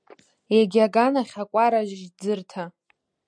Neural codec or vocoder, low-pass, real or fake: none; 9.9 kHz; real